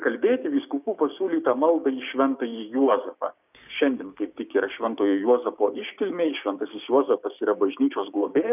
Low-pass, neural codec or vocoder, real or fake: 3.6 kHz; codec, 16 kHz, 6 kbps, DAC; fake